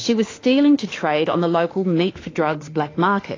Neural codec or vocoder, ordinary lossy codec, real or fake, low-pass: autoencoder, 48 kHz, 32 numbers a frame, DAC-VAE, trained on Japanese speech; AAC, 32 kbps; fake; 7.2 kHz